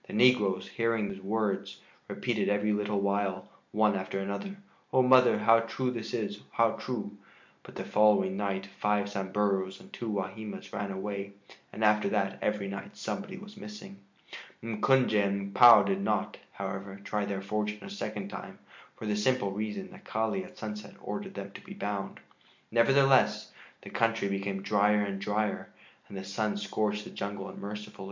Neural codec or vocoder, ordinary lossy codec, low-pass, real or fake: none; MP3, 64 kbps; 7.2 kHz; real